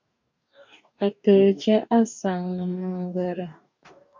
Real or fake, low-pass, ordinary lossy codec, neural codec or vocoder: fake; 7.2 kHz; MP3, 64 kbps; codec, 44.1 kHz, 2.6 kbps, DAC